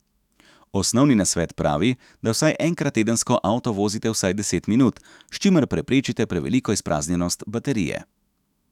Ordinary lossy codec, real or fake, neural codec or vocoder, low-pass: none; fake; autoencoder, 48 kHz, 128 numbers a frame, DAC-VAE, trained on Japanese speech; 19.8 kHz